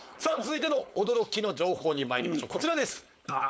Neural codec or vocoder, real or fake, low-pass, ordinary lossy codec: codec, 16 kHz, 4.8 kbps, FACodec; fake; none; none